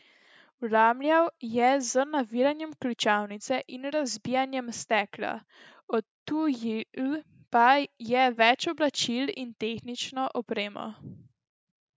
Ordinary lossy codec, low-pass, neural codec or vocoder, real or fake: none; none; none; real